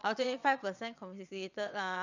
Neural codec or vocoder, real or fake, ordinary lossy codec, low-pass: vocoder, 22.05 kHz, 80 mel bands, WaveNeXt; fake; none; 7.2 kHz